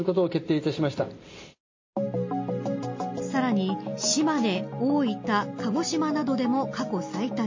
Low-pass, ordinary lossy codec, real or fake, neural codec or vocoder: 7.2 kHz; MP3, 32 kbps; real; none